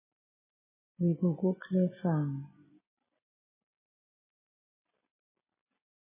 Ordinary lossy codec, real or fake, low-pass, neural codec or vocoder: MP3, 16 kbps; real; 3.6 kHz; none